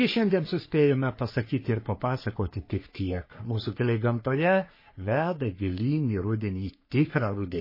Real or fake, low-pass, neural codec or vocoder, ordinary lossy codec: fake; 5.4 kHz; codec, 44.1 kHz, 3.4 kbps, Pupu-Codec; MP3, 24 kbps